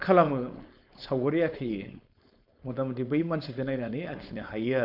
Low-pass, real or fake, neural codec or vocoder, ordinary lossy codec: 5.4 kHz; fake; codec, 16 kHz, 4.8 kbps, FACodec; none